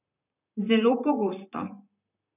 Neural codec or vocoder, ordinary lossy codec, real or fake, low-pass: vocoder, 44.1 kHz, 128 mel bands, Pupu-Vocoder; none; fake; 3.6 kHz